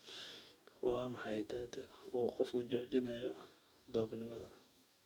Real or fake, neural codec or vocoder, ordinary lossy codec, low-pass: fake; codec, 44.1 kHz, 2.6 kbps, DAC; none; 19.8 kHz